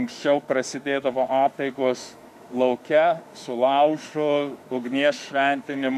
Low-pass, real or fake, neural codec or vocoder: 14.4 kHz; fake; autoencoder, 48 kHz, 32 numbers a frame, DAC-VAE, trained on Japanese speech